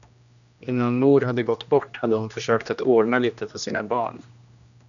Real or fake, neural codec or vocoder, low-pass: fake; codec, 16 kHz, 1 kbps, X-Codec, HuBERT features, trained on general audio; 7.2 kHz